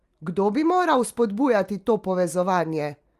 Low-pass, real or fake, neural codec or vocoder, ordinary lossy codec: 14.4 kHz; real; none; Opus, 32 kbps